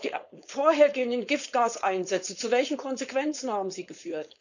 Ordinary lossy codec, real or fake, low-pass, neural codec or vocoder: none; fake; 7.2 kHz; codec, 16 kHz, 4.8 kbps, FACodec